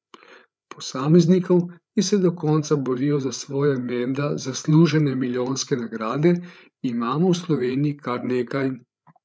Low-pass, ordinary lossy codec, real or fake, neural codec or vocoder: none; none; fake; codec, 16 kHz, 8 kbps, FreqCodec, larger model